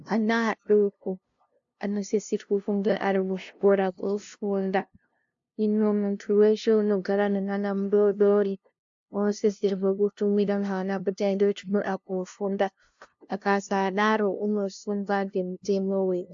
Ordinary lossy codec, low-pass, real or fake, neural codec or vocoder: AAC, 64 kbps; 7.2 kHz; fake; codec, 16 kHz, 0.5 kbps, FunCodec, trained on LibriTTS, 25 frames a second